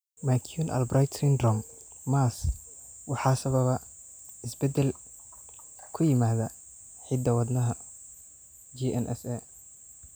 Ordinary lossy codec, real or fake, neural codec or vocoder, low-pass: none; real; none; none